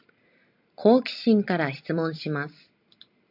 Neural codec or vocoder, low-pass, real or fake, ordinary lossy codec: none; 5.4 kHz; real; AAC, 48 kbps